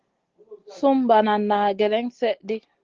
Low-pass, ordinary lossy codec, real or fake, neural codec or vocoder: 7.2 kHz; Opus, 16 kbps; real; none